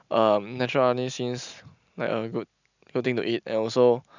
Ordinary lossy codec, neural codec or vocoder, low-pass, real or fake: none; none; 7.2 kHz; real